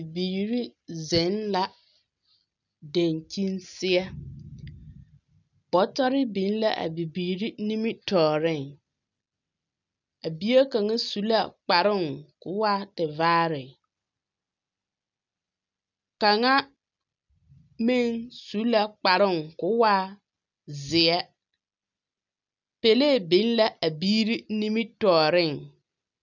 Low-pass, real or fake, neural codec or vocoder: 7.2 kHz; real; none